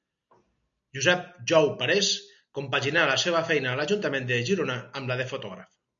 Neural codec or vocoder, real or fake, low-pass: none; real; 7.2 kHz